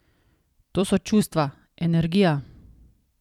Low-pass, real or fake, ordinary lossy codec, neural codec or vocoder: 19.8 kHz; fake; none; vocoder, 44.1 kHz, 128 mel bands every 256 samples, BigVGAN v2